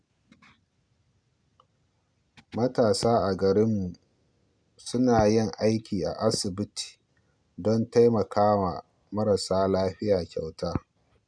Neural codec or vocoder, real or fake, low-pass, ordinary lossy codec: none; real; 9.9 kHz; none